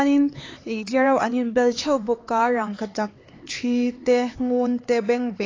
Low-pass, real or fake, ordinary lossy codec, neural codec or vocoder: 7.2 kHz; fake; AAC, 32 kbps; codec, 16 kHz, 4 kbps, X-Codec, HuBERT features, trained on LibriSpeech